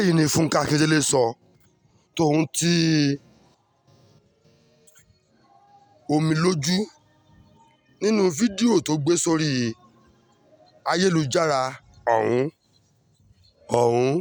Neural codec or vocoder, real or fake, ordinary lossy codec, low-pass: none; real; none; none